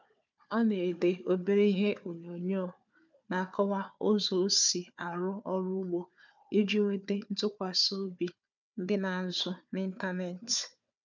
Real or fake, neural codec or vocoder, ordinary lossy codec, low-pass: fake; codec, 16 kHz, 4 kbps, FunCodec, trained on Chinese and English, 50 frames a second; none; 7.2 kHz